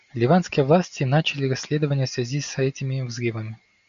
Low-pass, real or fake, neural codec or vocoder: 7.2 kHz; real; none